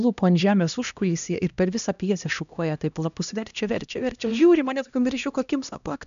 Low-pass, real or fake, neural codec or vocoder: 7.2 kHz; fake; codec, 16 kHz, 1 kbps, X-Codec, HuBERT features, trained on LibriSpeech